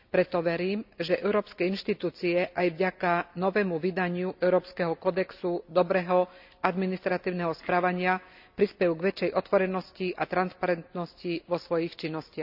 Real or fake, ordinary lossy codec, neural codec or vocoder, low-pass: real; none; none; 5.4 kHz